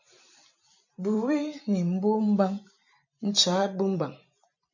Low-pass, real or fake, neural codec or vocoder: 7.2 kHz; fake; vocoder, 44.1 kHz, 128 mel bands every 256 samples, BigVGAN v2